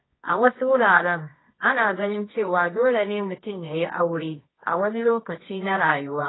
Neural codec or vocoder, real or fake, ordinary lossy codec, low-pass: codec, 24 kHz, 0.9 kbps, WavTokenizer, medium music audio release; fake; AAC, 16 kbps; 7.2 kHz